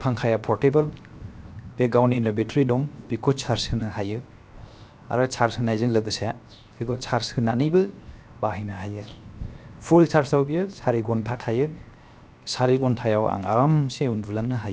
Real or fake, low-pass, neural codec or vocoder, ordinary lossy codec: fake; none; codec, 16 kHz, 0.7 kbps, FocalCodec; none